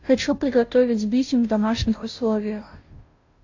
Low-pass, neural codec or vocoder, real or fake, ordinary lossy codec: 7.2 kHz; codec, 16 kHz, 0.5 kbps, FunCodec, trained on Chinese and English, 25 frames a second; fake; AAC, 48 kbps